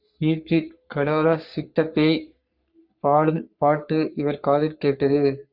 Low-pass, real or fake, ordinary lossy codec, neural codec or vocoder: 5.4 kHz; fake; Opus, 64 kbps; codec, 44.1 kHz, 3.4 kbps, Pupu-Codec